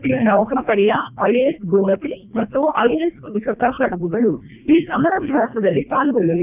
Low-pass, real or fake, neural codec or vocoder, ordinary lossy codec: 3.6 kHz; fake; codec, 24 kHz, 1.5 kbps, HILCodec; none